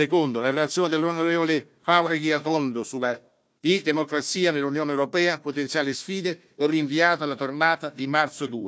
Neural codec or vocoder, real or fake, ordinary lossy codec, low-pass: codec, 16 kHz, 1 kbps, FunCodec, trained on Chinese and English, 50 frames a second; fake; none; none